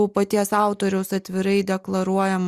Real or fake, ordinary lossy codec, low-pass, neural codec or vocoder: real; Opus, 64 kbps; 14.4 kHz; none